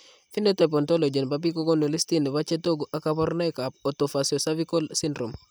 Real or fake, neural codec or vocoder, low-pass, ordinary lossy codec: real; none; none; none